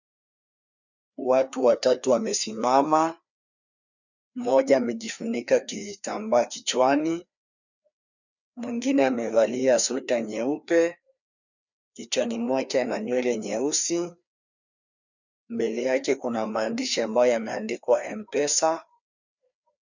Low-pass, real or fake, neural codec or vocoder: 7.2 kHz; fake; codec, 16 kHz, 2 kbps, FreqCodec, larger model